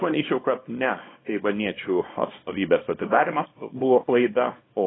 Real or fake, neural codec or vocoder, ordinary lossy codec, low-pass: fake; codec, 24 kHz, 0.9 kbps, WavTokenizer, small release; AAC, 16 kbps; 7.2 kHz